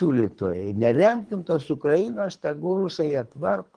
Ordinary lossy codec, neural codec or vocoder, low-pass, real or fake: Opus, 24 kbps; codec, 24 kHz, 3 kbps, HILCodec; 9.9 kHz; fake